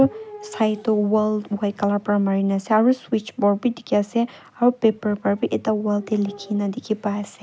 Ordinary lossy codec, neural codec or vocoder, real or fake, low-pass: none; none; real; none